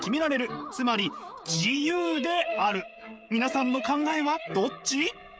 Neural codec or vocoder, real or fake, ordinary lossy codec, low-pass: codec, 16 kHz, 16 kbps, FreqCodec, smaller model; fake; none; none